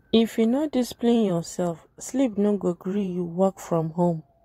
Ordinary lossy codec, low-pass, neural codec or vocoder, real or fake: AAC, 48 kbps; 19.8 kHz; vocoder, 44.1 kHz, 128 mel bands every 512 samples, BigVGAN v2; fake